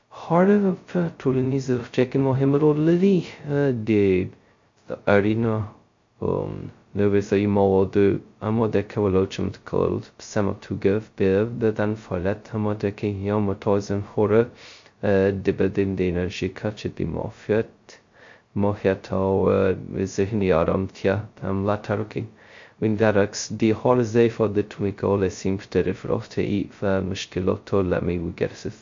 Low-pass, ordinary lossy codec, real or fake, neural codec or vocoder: 7.2 kHz; MP3, 48 kbps; fake; codec, 16 kHz, 0.2 kbps, FocalCodec